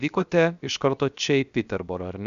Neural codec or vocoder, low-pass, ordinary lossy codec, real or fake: codec, 16 kHz, 0.7 kbps, FocalCodec; 7.2 kHz; Opus, 64 kbps; fake